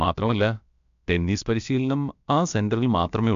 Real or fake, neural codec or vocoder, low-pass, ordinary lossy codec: fake; codec, 16 kHz, about 1 kbps, DyCAST, with the encoder's durations; 7.2 kHz; MP3, 64 kbps